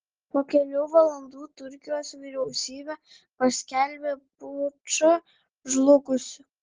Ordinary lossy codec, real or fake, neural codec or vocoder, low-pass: Opus, 16 kbps; real; none; 7.2 kHz